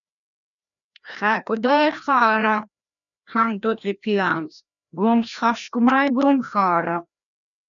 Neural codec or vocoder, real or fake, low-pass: codec, 16 kHz, 1 kbps, FreqCodec, larger model; fake; 7.2 kHz